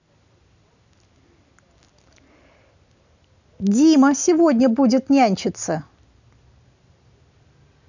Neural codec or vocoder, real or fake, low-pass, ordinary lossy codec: none; real; 7.2 kHz; none